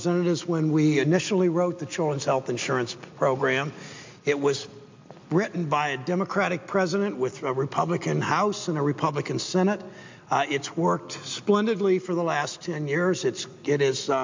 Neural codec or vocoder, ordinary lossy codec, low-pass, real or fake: none; MP3, 64 kbps; 7.2 kHz; real